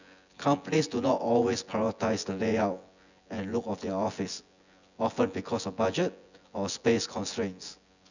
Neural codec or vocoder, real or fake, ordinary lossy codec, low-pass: vocoder, 24 kHz, 100 mel bands, Vocos; fake; none; 7.2 kHz